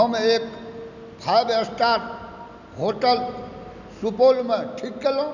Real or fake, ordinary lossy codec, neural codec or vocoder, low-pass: real; none; none; 7.2 kHz